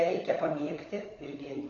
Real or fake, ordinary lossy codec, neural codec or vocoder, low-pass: fake; AAC, 32 kbps; codec, 16 kHz, 16 kbps, FunCodec, trained on LibriTTS, 50 frames a second; 7.2 kHz